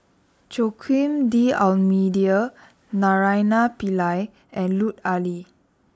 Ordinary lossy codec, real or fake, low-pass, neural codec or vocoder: none; real; none; none